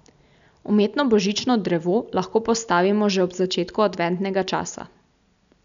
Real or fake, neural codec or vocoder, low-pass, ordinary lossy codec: real; none; 7.2 kHz; none